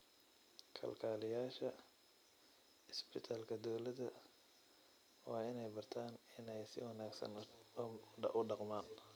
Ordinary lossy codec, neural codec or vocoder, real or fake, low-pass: none; vocoder, 44.1 kHz, 128 mel bands every 256 samples, BigVGAN v2; fake; none